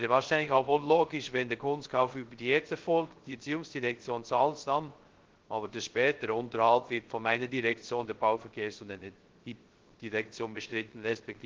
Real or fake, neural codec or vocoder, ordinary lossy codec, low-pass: fake; codec, 16 kHz, 0.3 kbps, FocalCodec; Opus, 16 kbps; 7.2 kHz